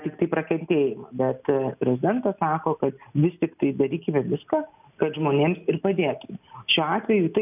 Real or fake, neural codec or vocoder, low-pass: real; none; 3.6 kHz